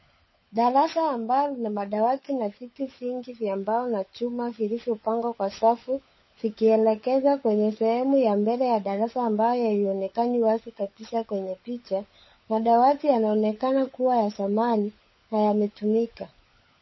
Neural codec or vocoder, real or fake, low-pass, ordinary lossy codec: codec, 16 kHz, 16 kbps, FunCodec, trained on LibriTTS, 50 frames a second; fake; 7.2 kHz; MP3, 24 kbps